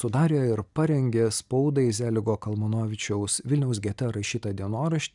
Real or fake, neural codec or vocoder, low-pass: real; none; 10.8 kHz